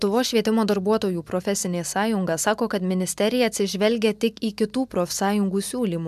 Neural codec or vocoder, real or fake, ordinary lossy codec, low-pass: none; real; MP3, 96 kbps; 14.4 kHz